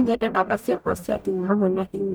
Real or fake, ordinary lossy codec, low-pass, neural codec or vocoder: fake; none; none; codec, 44.1 kHz, 0.9 kbps, DAC